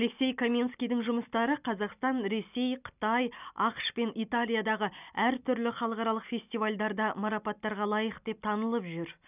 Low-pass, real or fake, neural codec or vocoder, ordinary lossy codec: 3.6 kHz; real; none; none